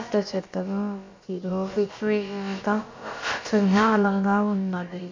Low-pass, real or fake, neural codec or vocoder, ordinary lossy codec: 7.2 kHz; fake; codec, 16 kHz, about 1 kbps, DyCAST, with the encoder's durations; MP3, 48 kbps